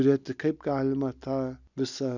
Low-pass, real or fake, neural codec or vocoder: 7.2 kHz; real; none